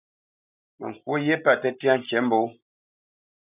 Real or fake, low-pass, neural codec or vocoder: real; 3.6 kHz; none